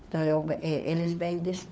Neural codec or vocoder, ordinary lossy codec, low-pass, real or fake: codec, 16 kHz, 4 kbps, FunCodec, trained on LibriTTS, 50 frames a second; none; none; fake